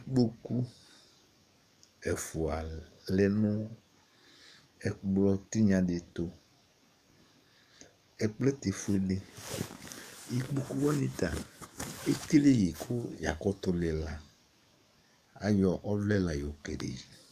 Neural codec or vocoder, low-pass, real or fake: codec, 44.1 kHz, 7.8 kbps, DAC; 14.4 kHz; fake